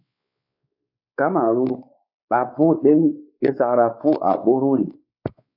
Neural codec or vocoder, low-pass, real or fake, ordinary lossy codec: codec, 16 kHz, 4 kbps, X-Codec, WavLM features, trained on Multilingual LibriSpeech; 5.4 kHz; fake; AAC, 48 kbps